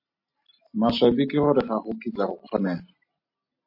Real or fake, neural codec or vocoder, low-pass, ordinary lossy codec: real; none; 5.4 kHz; MP3, 32 kbps